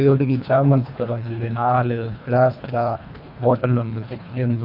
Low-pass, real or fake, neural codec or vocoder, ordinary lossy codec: 5.4 kHz; fake; codec, 24 kHz, 1.5 kbps, HILCodec; none